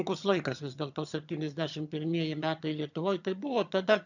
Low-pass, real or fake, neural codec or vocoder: 7.2 kHz; fake; vocoder, 22.05 kHz, 80 mel bands, HiFi-GAN